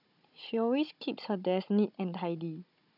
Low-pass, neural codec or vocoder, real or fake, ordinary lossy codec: 5.4 kHz; codec, 16 kHz, 16 kbps, FunCodec, trained on Chinese and English, 50 frames a second; fake; none